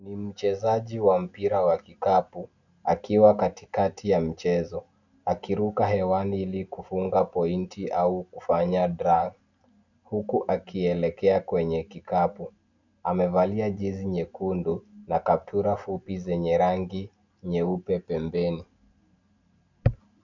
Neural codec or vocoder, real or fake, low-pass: none; real; 7.2 kHz